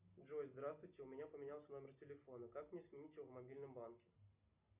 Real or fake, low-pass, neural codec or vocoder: real; 3.6 kHz; none